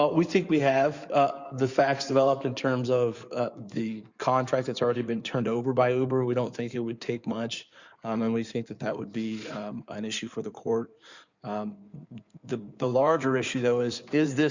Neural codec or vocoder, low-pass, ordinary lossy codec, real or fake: codec, 16 kHz in and 24 kHz out, 2.2 kbps, FireRedTTS-2 codec; 7.2 kHz; Opus, 64 kbps; fake